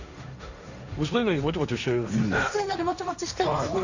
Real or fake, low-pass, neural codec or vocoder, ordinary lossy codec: fake; 7.2 kHz; codec, 16 kHz, 1.1 kbps, Voila-Tokenizer; none